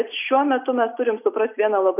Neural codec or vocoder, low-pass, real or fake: none; 3.6 kHz; real